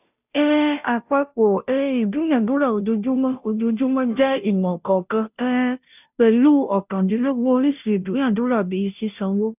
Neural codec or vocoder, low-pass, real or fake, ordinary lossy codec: codec, 16 kHz, 0.5 kbps, FunCodec, trained on Chinese and English, 25 frames a second; 3.6 kHz; fake; none